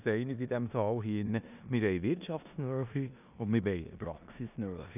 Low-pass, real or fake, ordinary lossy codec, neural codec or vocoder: 3.6 kHz; fake; none; codec, 16 kHz in and 24 kHz out, 0.9 kbps, LongCat-Audio-Codec, four codebook decoder